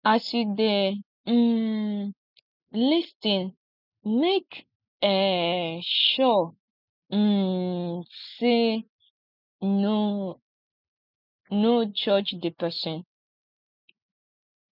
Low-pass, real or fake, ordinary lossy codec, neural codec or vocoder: 5.4 kHz; real; none; none